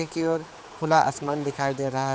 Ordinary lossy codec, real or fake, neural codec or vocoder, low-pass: none; fake; codec, 16 kHz, 4 kbps, X-Codec, HuBERT features, trained on general audio; none